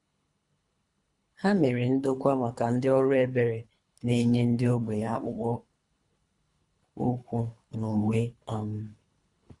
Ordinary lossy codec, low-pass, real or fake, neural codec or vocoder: Opus, 64 kbps; 10.8 kHz; fake; codec, 24 kHz, 3 kbps, HILCodec